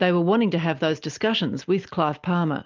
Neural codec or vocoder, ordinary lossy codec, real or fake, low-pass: none; Opus, 24 kbps; real; 7.2 kHz